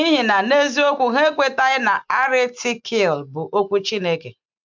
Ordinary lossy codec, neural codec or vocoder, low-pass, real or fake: MP3, 64 kbps; none; 7.2 kHz; real